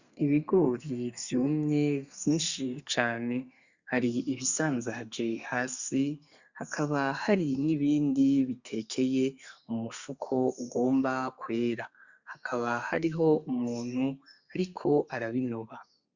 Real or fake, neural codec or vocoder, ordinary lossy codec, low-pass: fake; codec, 32 kHz, 1.9 kbps, SNAC; Opus, 64 kbps; 7.2 kHz